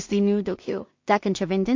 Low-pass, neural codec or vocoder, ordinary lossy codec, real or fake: 7.2 kHz; codec, 16 kHz in and 24 kHz out, 0.4 kbps, LongCat-Audio-Codec, two codebook decoder; MP3, 48 kbps; fake